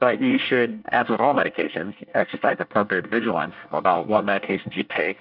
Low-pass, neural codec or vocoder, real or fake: 5.4 kHz; codec, 24 kHz, 1 kbps, SNAC; fake